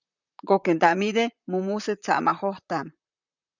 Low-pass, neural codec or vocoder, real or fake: 7.2 kHz; vocoder, 44.1 kHz, 128 mel bands, Pupu-Vocoder; fake